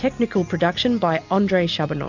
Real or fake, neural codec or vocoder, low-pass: real; none; 7.2 kHz